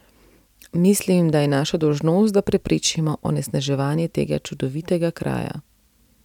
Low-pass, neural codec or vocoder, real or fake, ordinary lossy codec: 19.8 kHz; none; real; none